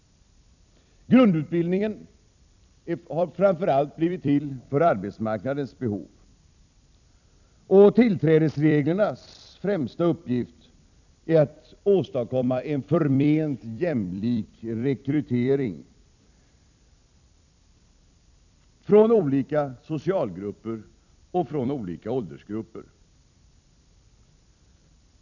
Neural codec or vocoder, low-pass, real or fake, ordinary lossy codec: vocoder, 44.1 kHz, 128 mel bands every 256 samples, BigVGAN v2; 7.2 kHz; fake; none